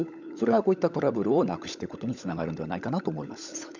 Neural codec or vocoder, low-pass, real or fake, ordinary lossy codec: codec, 16 kHz, 16 kbps, FunCodec, trained on LibriTTS, 50 frames a second; 7.2 kHz; fake; none